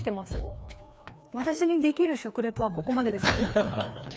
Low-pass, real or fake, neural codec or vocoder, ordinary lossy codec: none; fake; codec, 16 kHz, 2 kbps, FreqCodec, larger model; none